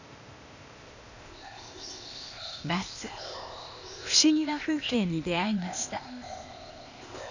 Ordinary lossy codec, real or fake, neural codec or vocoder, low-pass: none; fake; codec, 16 kHz, 0.8 kbps, ZipCodec; 7.2 kHz